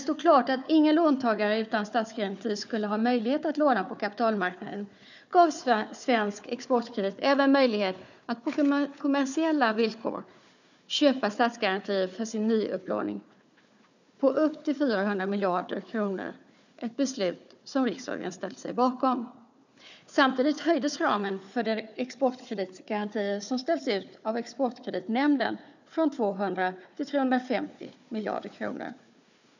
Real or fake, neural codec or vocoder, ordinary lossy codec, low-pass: fake; codec, 16 kHz, 4 kbps, FunCodec, trained on Chinese and English, 50 frames a second; none; 7.2 kHz